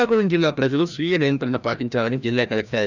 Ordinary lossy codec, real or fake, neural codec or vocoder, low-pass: none; fake; codec, 16 kHz, 1 kbps, FreqCodec, larger model; 7.2 kHz